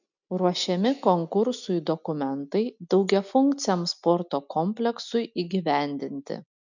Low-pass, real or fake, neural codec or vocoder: 7.2 kHz; real; none